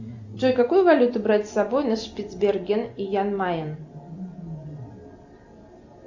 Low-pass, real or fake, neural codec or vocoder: 7.2 kHz; real; none